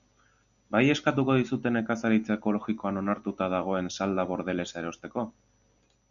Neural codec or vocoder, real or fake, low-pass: none; real; 7.2 kHz